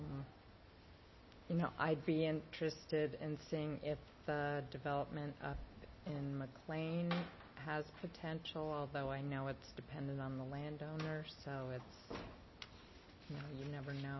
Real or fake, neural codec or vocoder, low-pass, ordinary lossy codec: real; none; 7.2 kHz; MP3, 24 kbps